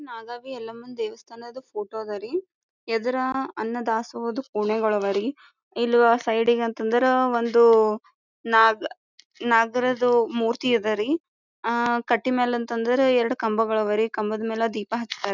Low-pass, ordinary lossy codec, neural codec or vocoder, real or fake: 7.2 kHz; none; none; real